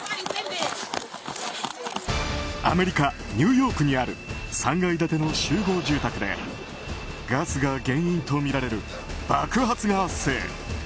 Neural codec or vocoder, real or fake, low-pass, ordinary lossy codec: none; real; none; none